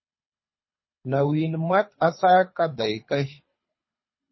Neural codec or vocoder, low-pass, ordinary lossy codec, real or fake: codec, 24 kHz, 3 kbps, HILCodec; 7.2 kHz; MP3, 24 kbps; fake